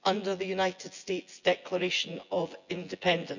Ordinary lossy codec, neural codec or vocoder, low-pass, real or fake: none; vocoder, 24 kHz, 100 mel bands, Vocos; 7.2 kHz; fake